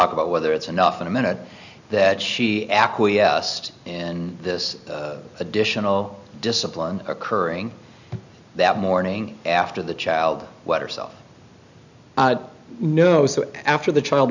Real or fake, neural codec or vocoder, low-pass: real; none; 7.2 kHz